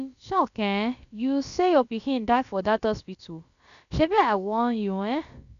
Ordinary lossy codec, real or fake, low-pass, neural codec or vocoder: none; fake; 7.2 kHz; codec, 16 kHz, about 1 kbps, DyCAST, with the encoder's durations